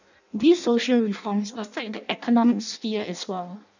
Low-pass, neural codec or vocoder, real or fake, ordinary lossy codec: 7.2 kHz; codec, 16 kHz in and 24 kHz out, 0.6 kbps, FireRedTTS-2 codec; fake; none